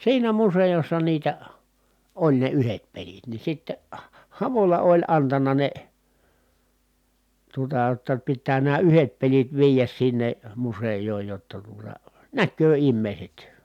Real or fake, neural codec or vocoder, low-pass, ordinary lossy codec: real; none; 19.8 kHz; none